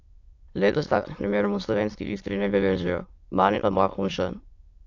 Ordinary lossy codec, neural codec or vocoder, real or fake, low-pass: AAC, 48 kbps; autoencoder, 22.05 kHz, a latent of 192 numbers a frame, VITS, trained on many speakers; fake; 7.2 kHz